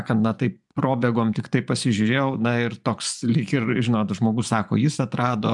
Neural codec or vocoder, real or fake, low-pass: vocoder, 24 kHz, 100 mel bands, Vocos; fake; 10.8 kHz